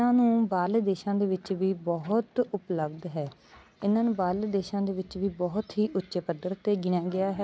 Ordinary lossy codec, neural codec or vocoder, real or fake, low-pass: none; none; real; none